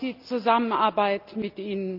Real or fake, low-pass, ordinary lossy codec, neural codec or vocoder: real; 5.4 kHz; Opus, 24 kbps; none